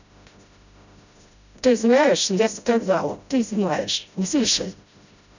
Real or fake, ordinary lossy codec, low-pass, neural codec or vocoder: fake; none; 7.2 kHz; codec, 16 kHz, 0.5 kbps, FreqCodec, smaller model